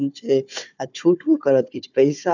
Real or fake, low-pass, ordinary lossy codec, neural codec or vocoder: fake; 7.2 kHz; none; codec, 16 kHz, 4 kbps, FunCodec, trained on Chinese and English, 50 frames a second